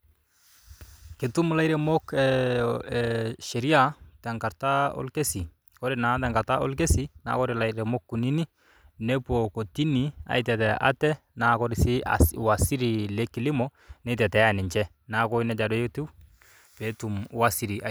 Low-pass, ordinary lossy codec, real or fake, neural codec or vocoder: none; none; real; none